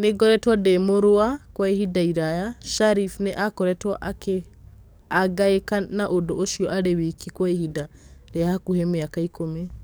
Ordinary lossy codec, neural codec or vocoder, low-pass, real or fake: none; codec, 44.1 kHz, 7.8 kbps, DAC; none; fake